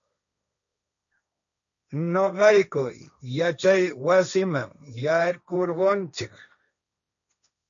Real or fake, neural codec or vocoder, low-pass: fake; codec, 16 kHz, 1.1 kbps, Voila-Tokenizer; 7.2 kHz